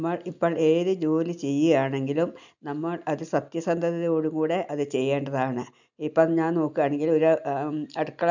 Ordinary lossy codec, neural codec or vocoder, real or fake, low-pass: none; none; real; 7.2 kHz